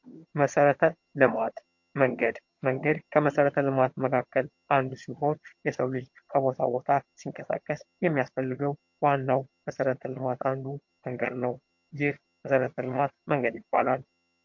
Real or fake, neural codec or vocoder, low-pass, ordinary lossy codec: fake; vocoder, 22.05 kHz, 80 mel bands, HiFi-GAN; 7.2 kHz; MP3, 48 kbps